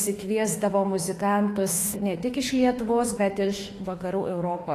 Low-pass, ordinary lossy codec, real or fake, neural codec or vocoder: 14.4 kHz; AAC, 48 kbps; fake; autoencoder, 48 kHz, 32 numbers a frame, DAC-VAE, trained on Japanese speech